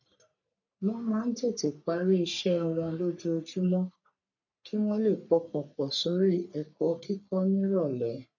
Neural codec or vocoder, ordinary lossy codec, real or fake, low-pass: codec, 44.1 kHz, 3.4 kbps, Pupu-Codec; none; fake; 7.2 kHz